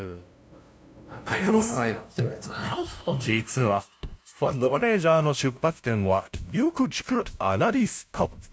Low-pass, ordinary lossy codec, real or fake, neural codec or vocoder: none; none; fake; codec, 16 kHz, 0.5 kbps, FunCodec, trained on LibriTTS, 25 frames a second